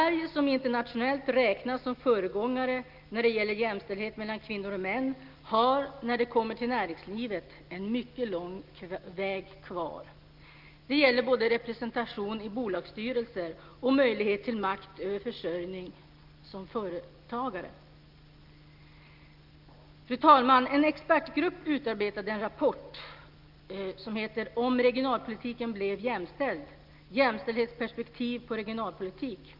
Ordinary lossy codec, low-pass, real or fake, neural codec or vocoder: Opus, 24 kbps; 5.4 kHz; real; none